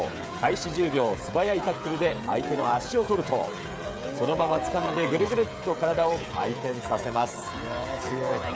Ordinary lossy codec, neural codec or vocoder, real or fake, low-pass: none; codec, 16 kHz, 16 kbps, FreqCodec, smaller model; fake; none